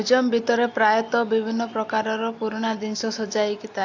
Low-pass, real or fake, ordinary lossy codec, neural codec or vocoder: 7.2 kHz; real; AAC, 48 kbps; none